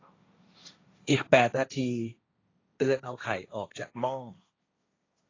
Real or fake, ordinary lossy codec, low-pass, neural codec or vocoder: fake; AAC, 32 kbps; 7.2 kHz; codec, 16 kHz, 1.1 kbps, Voila-Tokenizer